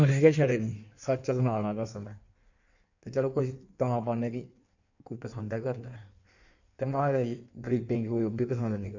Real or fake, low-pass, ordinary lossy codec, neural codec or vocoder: fake; 7.2 kHz; none; codec, 16 kHz in and 24 kHz out, 1.1 kbps, FireRedTTS-2 codec